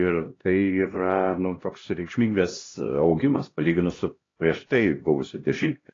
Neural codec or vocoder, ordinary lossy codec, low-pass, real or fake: codec, 16 kHz, 1 kbps, X-Codec, HuBERT features, trained on LibriSpeech; AAC, 32 kbps; 7.2 kHz; fake